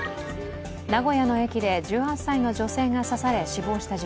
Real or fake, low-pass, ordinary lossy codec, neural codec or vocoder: real; none; none; none